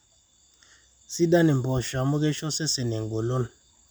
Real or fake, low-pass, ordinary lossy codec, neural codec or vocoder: real; none; none; none